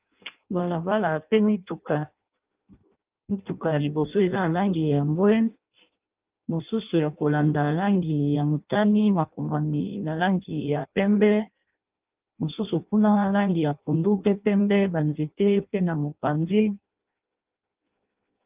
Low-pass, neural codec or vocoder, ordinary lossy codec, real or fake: 3.6 kHz; codec, 16 kHz in and 24 kHz out, 0.6 kbps, FireRedTTS-2 codec; Opus, 24 kbps; fake